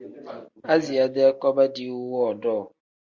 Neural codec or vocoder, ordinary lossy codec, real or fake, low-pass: none; Opus, 64 kbps; real; 7.2 kHz